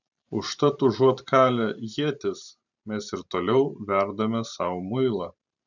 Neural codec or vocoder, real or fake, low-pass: vocoder, 44.1 kHz, 128 mel bands every 512 samples, BigVGAN v2; fake; 7.2 kHz